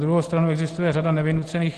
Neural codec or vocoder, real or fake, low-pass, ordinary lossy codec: none; real; 10.8 kHz; Opus, 16 kbps